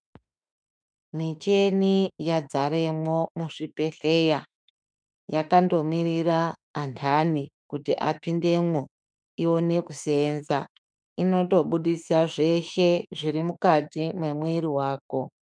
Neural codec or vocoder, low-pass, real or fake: autoencoder, 48 kHz, 32 numbers a frame, DAC-VAE, trained on Japanese speech; 9.9 kHz; fake